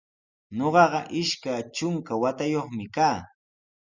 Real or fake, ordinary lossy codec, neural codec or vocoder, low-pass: real; Opus, 64 kbps; none; 7.2 kHz